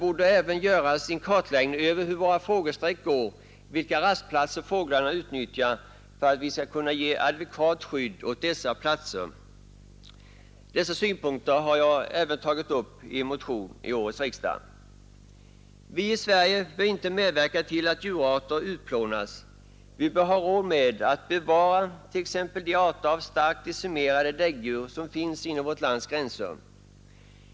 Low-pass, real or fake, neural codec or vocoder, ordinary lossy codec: none; real; none; none